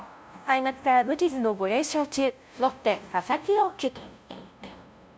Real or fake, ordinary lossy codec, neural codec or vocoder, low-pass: fake; none; codec, 16 kHz, 0.5 kbps, FunCodec, trained on LibriTTS, 25 frames a second; none